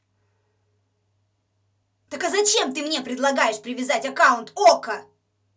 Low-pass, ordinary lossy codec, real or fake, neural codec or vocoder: none; none; real; none